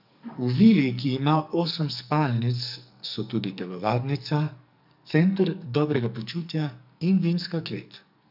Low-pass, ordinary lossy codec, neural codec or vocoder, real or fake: 5.4 kHz; none; codec, 44.1 kHz, 2.6 kbps, SNAC; fake